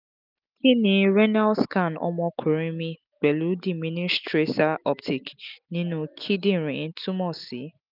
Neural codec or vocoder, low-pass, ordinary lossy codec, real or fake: none; 5.4 kHz; none; real